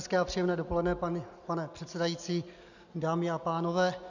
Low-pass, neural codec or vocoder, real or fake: 7.2 kHz; none; real